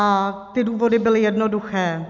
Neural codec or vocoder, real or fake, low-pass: none; real; 7.2 kHz